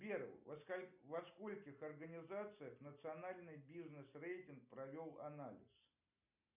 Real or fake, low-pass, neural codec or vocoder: real; 3.6 kHz; none